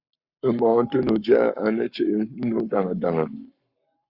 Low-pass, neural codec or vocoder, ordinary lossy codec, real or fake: 5.4 kHz; codec, 44.1 kHz, 7.8 kbps, Pupu-Codec; Opus, 64 kbps; fake